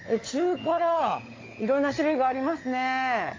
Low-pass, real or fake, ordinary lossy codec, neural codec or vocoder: 7.2 kHz; fake; AAC, 32 kbps; codec, 16 kHz, 16 kbps, FunCodec, trained on LibriTTS, 50 frames a second